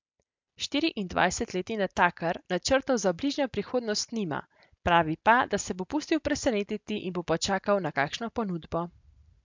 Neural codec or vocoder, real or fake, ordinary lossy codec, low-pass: none; real; MP3, 64 kbps; 7.2 kHz